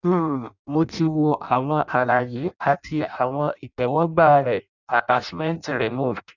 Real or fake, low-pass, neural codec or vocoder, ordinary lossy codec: fake; 7.2 kHz; codec, 16 kHz in and 24 kHz out, 0.6 kbps, FireRedTTS-2 codec; none